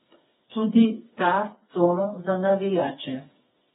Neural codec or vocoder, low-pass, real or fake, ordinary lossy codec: codec, 16 kHz, 4 kbps, FreqCodec, smaller model; 7.2 kHz; fake; AAC, 16 kbps